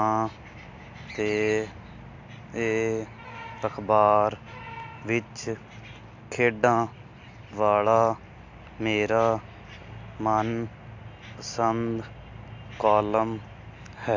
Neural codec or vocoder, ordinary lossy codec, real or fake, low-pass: none; AAC, 48 kbps; real; 7.2 kHz